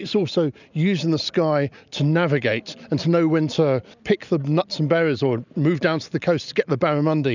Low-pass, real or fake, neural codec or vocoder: 7.2 kHz; real; none